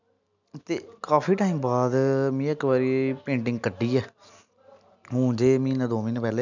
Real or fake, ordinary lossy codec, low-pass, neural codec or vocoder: real; none; 7.2 kHz; none